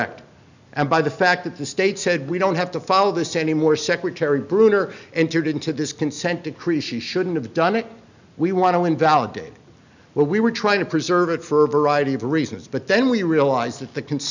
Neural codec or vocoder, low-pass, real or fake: none; 7.2 kHz; real